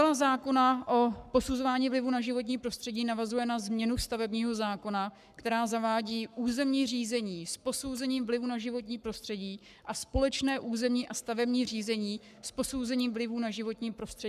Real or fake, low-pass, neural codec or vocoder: fake; 14.4 kHz; codec, 44.1 kHz, 7.8 kbps, Pupu-Codec